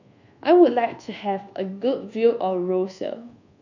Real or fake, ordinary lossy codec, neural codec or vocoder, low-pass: fake; none; codec, 24 kHz, 1.2 kbps, DualCodec; 7.2 kHz